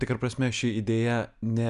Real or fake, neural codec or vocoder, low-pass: real; none; 10.8 kHz